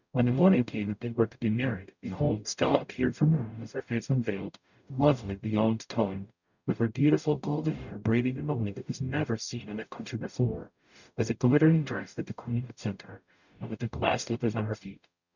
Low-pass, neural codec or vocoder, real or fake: 7.2 kHz; codec, 44.1 kHz, 0.9 kbps, DAC; fake